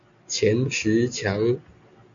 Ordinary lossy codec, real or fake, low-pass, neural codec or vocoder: MP3, 64 kbps; real; 7.2 kHz; none